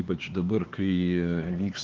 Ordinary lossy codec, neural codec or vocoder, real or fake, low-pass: Opus, 16 kbps; codec, 16 kHz, 4 kbps, X-Codec, HuBERT features, trained on LibriSpeech; fake; 7.2 kHz